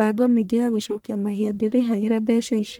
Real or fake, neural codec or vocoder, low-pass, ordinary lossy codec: fake; codec, 44.1 kHz, 1.7 kbps, Pupu-Codec; none; none